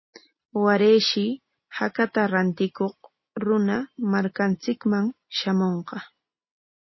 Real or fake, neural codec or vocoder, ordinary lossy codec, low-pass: real; none; MP3, 24 kbps; 7.2 kHz